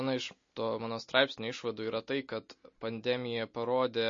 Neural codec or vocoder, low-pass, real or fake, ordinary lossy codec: none; 7.2 kHz; real; MP3, 32 kbps